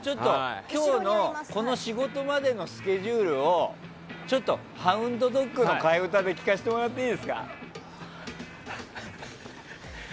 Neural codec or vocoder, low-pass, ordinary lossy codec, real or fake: none; none; none; real